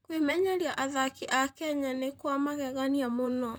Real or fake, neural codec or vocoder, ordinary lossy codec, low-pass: fake; vocoder, 44.1 kHz, 128 mel bands, Pupu-Vocoder; none; none